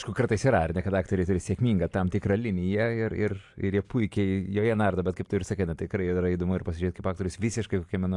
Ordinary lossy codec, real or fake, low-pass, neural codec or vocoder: AAC, 64 kbps; real; 10.8 kHz; none